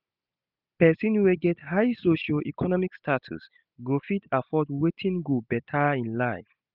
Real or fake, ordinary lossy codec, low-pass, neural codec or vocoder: real; none; 5.4 kHz; none